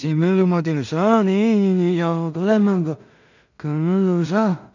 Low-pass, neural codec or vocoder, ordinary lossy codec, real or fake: 7.2 kHz; codec, 16 kHz in and 24 kHz out, 0.4 kbps, LongCat-Audio-Codec, two codebook decoder; none; fake